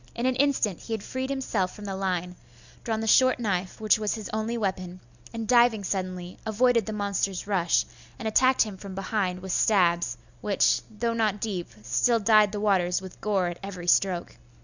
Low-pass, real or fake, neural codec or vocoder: 7.2 kHz; real; none